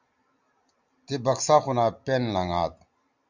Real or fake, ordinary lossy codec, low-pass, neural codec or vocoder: real; Opus, 64 kbps; 7.2 kHz; none